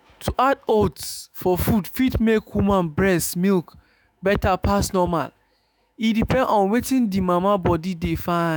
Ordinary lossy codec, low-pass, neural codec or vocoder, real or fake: none; none; autoencoder, 48 kHz, 128 numbers a frame, DAC-VAE, trained on Japanese speech; fake